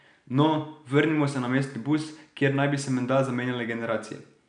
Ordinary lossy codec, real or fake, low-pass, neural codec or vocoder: none; real; 9.9 kHz; none